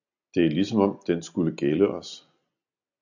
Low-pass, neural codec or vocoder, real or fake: 7.2 kHz; none; real